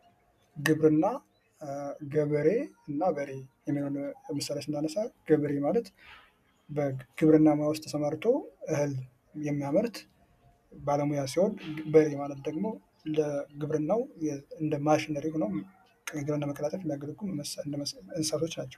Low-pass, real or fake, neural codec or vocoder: 14.4 kHz; real; none